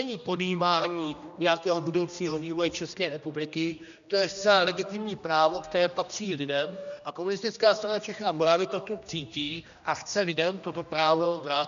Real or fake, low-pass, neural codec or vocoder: fake; 7.2 kHz; codec, 16 kHz, 1 kbps, X-Codec, HuBERT features, trained on general audio